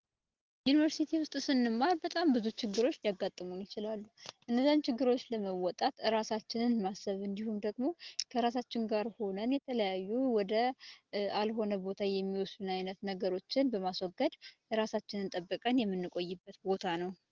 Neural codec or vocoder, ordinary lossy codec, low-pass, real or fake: none; Opus, 16 kbps; 7.2 kHz; real